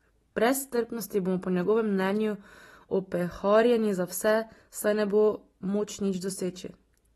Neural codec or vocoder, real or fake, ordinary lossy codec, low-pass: none; real; AAC, 32 kbps; 19.8 kHz